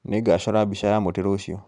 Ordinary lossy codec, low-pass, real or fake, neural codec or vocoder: none; 10.8 kHz; fake; vocoder, 44.1 kHz, 128 mel bands every 512 samples, BigVGAN v2